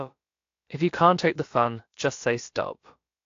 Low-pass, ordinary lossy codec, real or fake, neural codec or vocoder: 7.2 kHz; AAC, 48 kbps; fake; codec, 16 kHz, about 1 kbps, DyCAST, with the encoder's durations